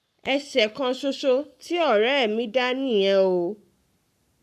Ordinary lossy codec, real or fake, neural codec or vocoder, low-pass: none; fake; codec, 44.1 kHz, 7.8 kbps, Pupu-Codec; 14.4 kHz